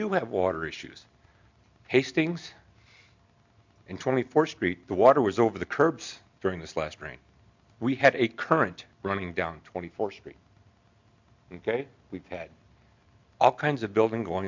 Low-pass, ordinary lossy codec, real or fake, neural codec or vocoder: 7.2 kHz; MP3, 64 kbps; real; none